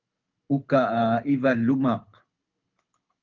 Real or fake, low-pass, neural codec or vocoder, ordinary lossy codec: fake; 7.2 kHz; codec, 24 kHz, 6 kbps, HILCodec; Opus, 24 kbps